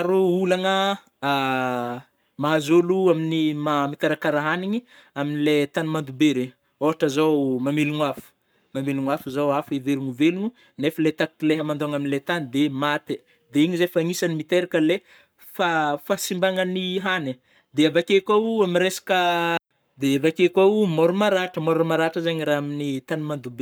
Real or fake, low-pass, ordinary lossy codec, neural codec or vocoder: fake; none; none; codec, 44.1 kHz, 7.8 kbps, Pupu-Codec